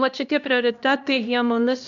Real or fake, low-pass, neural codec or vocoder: fake; 7.2 kHz; codec, 16 kHz, 1 kbps, X-Codec, HuBERT features, trained on LibriSpeech